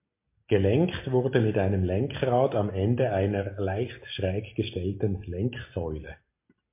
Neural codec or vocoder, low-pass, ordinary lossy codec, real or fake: none; 3.6 kHz; MP3, 24 kbps; real